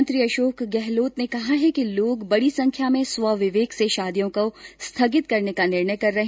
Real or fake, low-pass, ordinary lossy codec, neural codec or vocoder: real; none; none; none